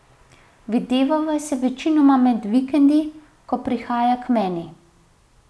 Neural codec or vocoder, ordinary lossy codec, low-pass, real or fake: none; none; none; real